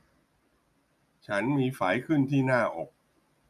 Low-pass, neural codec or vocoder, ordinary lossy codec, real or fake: 14.4 kHz; none; none; real